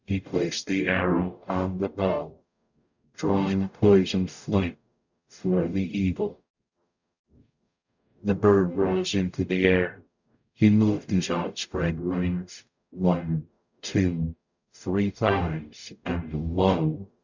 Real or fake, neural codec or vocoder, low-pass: fake; codec, 44.1 kHz, 0.9 kbps, DAC; 7.2 kHz